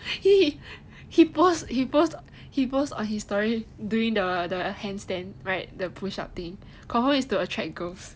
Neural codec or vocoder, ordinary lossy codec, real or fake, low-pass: none; none; real; none